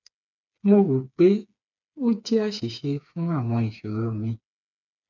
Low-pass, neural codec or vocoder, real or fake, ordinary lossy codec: 7.2 kHz; codec, 16 kHz, 4 kbps, FreqCodec, smaller model; fake; none